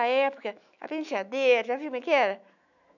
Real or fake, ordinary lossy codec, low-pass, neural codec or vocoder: fake; none; 7.2 kHz; autoencoder, 48 kHz, 128 numbers a frame, DAC-VAE, trained on Japanese speech